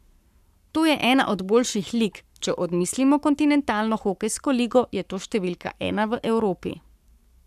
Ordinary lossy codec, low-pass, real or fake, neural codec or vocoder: none; 14.4 kHz; fake; codec, 44.1 kHz, 7.8 kbps, Pupu-Codec